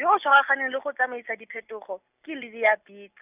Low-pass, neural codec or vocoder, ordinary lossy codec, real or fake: 3.6 kHz; none; none; real